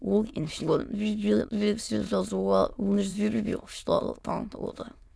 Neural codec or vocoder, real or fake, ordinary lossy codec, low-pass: autoencoder, 22.05 kHz, a latent of 192 numbers a frame, VITS, trained on many speakers; fake; none; none